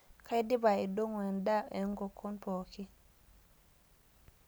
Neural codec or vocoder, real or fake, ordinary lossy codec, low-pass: none; real; none; none